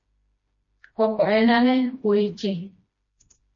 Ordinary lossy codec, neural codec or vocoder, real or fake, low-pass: MP3, 32 kbps; codec, 16 kHz, 1 kbps, FreqCodec, smaller model; fake; 7.2 kHz